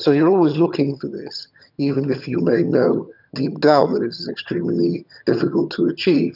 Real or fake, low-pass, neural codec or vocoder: fake; 5.4 kHz; vocoder, 22.05 kHz, 80 mel bands, HiFi-GAN